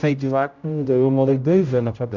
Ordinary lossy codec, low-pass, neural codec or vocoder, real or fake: none; 7.2 kHz; codec, 16 kHz, 0.5 kbps, X-Codec, HuBERT features, trained on general audio; fake